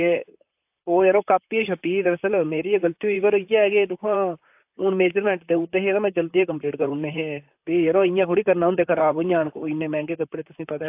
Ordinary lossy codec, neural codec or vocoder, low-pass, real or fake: AAC, 32 kbps; vocoder, 44.1 kHz, 128 mel bands, Pupu-Vocoder; 3.6 kHz; fake